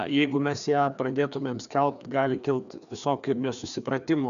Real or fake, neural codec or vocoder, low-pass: fake; codec, 16 kHz, 2 kbps, FreqCodec, larger model; 7.2 kHz